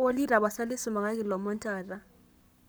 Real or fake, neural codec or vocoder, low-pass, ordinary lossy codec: fake; codec, 44.1 kHz, 7.8 kbps, Pupu-Codec; none; none